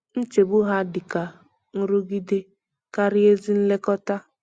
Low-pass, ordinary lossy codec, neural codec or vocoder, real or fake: 9.9 kHz; Opus, 64 kbps; none; real